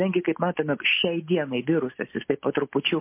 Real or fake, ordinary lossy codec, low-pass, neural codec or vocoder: real; MP3, 24 kbps; 3.6 kHz; none